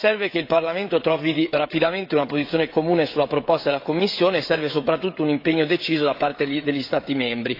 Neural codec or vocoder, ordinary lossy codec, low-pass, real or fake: codec, 16 kHz, 16 kbps, FreqCodec, smaller model; AAC, 32 kbps; 5.4 kHz; fake